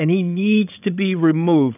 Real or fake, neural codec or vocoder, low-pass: fake; codec, 16 kHz, 16 kbps, FreqCodec, larger model; 3.6 kHz